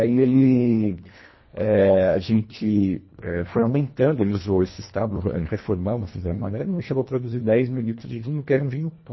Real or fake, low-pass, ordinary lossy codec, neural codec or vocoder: fake; 7.2 kHz; MP3, 24 kbps; codec, 24 kHz, 1.5 kbps, HILCodec